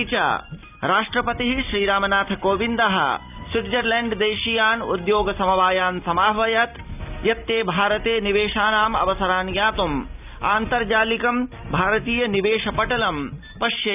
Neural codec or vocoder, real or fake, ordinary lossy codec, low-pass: none; real; none; 3.6 kHz